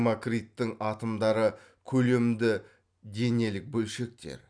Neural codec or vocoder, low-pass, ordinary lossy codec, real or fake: none; 9.9 kHz; none; real